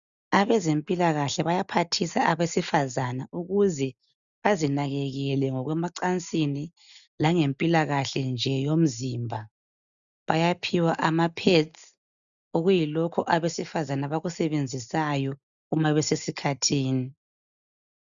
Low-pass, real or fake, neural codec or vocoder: 7.2 kHz; real; none